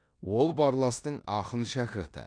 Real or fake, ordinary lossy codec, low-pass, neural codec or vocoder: fake; none; 9.9 kHz; codec, 16 kHz in and 24 kHz out, 0.9 kbps, LongCat-Audio-Codec, four codebook decoder